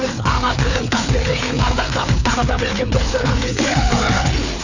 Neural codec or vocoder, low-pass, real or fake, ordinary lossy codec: codec, 16 kHz, 4 kbps, FreqCodec, larger model; 7.2 kHz; fake; none